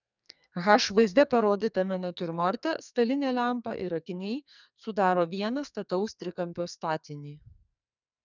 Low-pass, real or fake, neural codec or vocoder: 7.2 kHz; fake; codec, 44.1 kHz, 2.6 kbps, SNAC